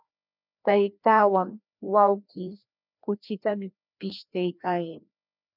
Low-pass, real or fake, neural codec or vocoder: 5.4 kHz; fake; codec, 16 kHz, 1 kbps, FreqCodec, larger model